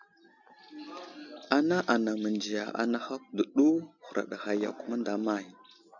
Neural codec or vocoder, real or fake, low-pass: none; real; 7.2 kHz